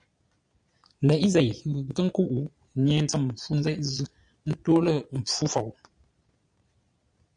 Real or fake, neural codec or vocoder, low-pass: fake; vocoder, 22.05 kHz, 80 mel bands, Vocos; 9.9 kHz